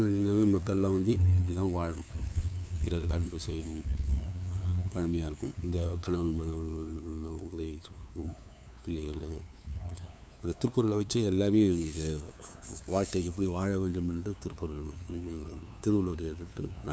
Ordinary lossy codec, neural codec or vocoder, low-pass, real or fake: none; codec, 16 kHz, 2 kbps, FunCodec, trained on LibriTTS, 25 frames a second; none; fake